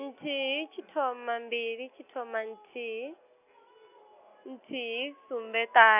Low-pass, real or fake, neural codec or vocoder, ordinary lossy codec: 3.6 kHz; real; none; none